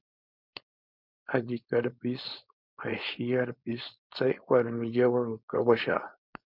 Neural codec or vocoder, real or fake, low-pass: codec, 16 kHz, 4.8 kbps, FACodec; fake; 5.4 kHz